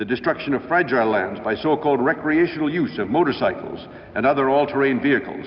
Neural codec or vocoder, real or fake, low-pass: none; real; 7.2 kHz